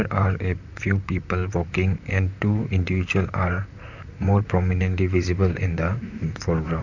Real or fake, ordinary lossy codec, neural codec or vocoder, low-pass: fake; none; vocoder, 44.1 kHz, 128 mel bands, Pupu-Vocoder; 7.2 kHz